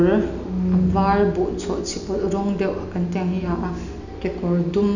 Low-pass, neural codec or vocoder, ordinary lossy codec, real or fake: 7.2 kHz; none; none; real